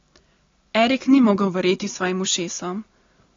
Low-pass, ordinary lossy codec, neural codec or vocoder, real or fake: 7.2 kHz; AAC, 32 kbps; none; real